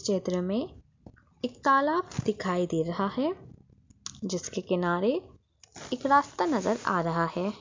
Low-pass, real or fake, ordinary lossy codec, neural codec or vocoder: 7.2 kHz; real; MP3, 64 kbps; none